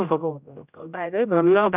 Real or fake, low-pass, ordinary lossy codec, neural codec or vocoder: fake; 3.6 kHz; none; codec, 16 kHz, 0.5 kbps, X-Codec, HuBERT features, trained on general audio